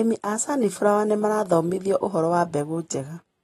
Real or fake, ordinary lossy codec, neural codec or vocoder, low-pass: real; AAC, 32 kbps; none; 19.8 kHz